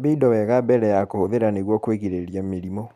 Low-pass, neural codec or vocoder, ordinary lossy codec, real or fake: 14.4 kHz; none; Opus, 64 kbps; real